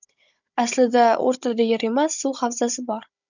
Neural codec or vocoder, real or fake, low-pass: codec, 16 kHz, 16 kbps, FunCodec, trained on Chinese and English, 50 frames a second; fake; 7.2 kHz